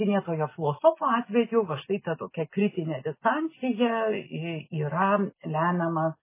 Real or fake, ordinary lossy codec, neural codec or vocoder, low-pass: real; MP3, 16 kbps; none; 3.6 kHz